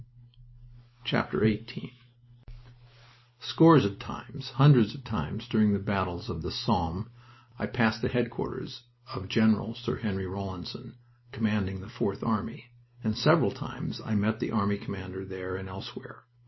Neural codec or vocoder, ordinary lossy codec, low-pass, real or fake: none; MP3, 24 kbps; 7.2 kHz; real